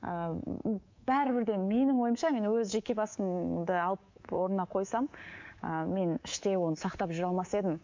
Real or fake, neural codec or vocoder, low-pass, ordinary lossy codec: fake; codec, 24 kHz, 3.1 kbps, DualCodec; 7.2 kHz; MP3, 48 kbps